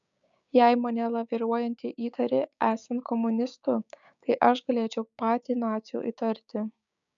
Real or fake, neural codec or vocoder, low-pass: fake; codec, 16 kHz, 6 kbps, DAC; 7.2 kHz